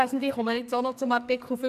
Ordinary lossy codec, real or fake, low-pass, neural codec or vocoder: none; fake; 14.4 kHz; codec, 44.1 kHz, 2.6 kbps, SNAC